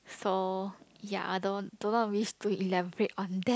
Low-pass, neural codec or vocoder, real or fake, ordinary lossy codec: none; none; real; none